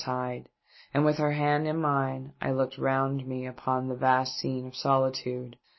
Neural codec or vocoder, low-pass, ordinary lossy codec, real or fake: none; 7.2 kHz; MP3, 24 kbps; real